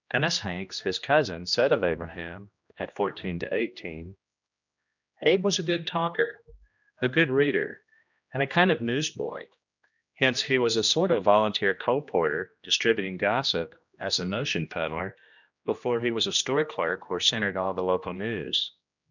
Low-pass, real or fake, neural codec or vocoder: 7.2 kHz; fake; codec, 16 kHz, 1 kbps, X-Codec, HuBERT features, trained on general audio